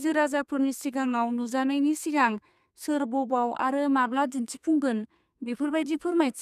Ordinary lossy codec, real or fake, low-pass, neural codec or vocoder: none; fake; 14.4 kHz; codec, 32 kHz, 1.9 kbps, SNAC